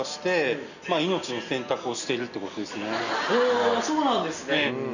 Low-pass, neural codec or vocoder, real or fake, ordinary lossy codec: 7.2 kHz; none; real; none